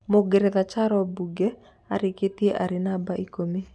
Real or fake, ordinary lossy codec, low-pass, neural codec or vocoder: real; none; none; none